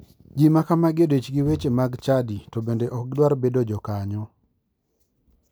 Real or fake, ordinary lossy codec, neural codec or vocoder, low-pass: real; none; none; none